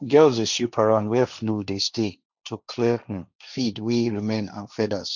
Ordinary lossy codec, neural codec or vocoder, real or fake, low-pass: none; codec, 16 kHz, 1.1 kbps, Voila-Tokenizer; fake; 7.2 kHz